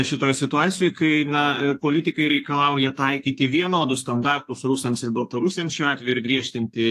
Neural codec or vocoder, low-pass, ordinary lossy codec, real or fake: codec, 32 kHz, 1.9 kbps, SNAC; 14.4 kHz; AAC, 64 kbps; fake